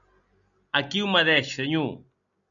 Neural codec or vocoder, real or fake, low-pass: none; real; 7.2 kHz